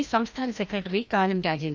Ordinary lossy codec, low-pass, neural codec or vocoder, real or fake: none; none; codec, 16 kHz, 1 kbps, FreqCodec, larger model; fake